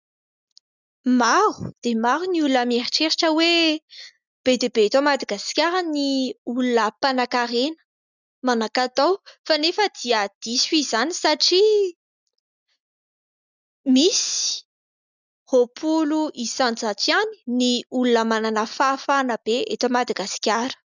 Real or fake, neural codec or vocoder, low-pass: real; none; 7.2 kHz